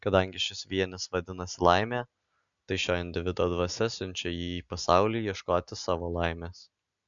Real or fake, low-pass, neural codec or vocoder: real; 7.2 kHz; none